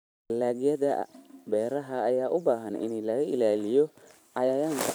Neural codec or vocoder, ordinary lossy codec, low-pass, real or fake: vocoder, 44.1 kHz, 128 mel bands every 512 samples, BigVGAN v2; none; none; fake